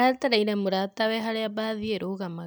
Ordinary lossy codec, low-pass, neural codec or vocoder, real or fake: none; none; none; real